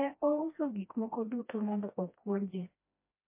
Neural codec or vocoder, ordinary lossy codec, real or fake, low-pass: codec, 16 kHz, 1 kbps, FreqCodec, smaller model; MP3, 24 kbps; fake; 3.6 kHz